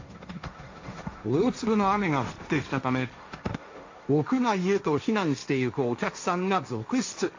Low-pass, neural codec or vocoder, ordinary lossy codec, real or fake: 7.2 kHz; codec, 16 kHz, 1.1 kbps, Voila-Tokenizer; AAC, 48 kbps; fake